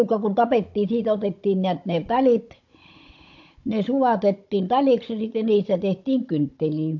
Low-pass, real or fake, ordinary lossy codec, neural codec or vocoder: 7.2 kHz; fake; MP3, 48 kbps; codec, 16 kHz, 16 kbps, FunCodec, trained on Chinese and English, 50 frames a second